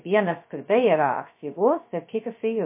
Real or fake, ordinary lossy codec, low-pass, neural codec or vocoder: fake; MP3, 24 kbps; 3.6 kHz; codec, 16 kHz, 0.2 kbps, FocalCodec